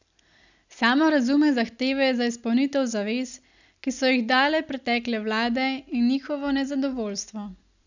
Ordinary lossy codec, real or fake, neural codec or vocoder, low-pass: none; real; none; 7.2 kHz